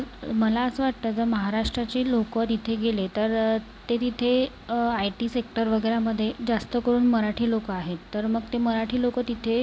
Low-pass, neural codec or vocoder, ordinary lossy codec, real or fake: none; none; none; real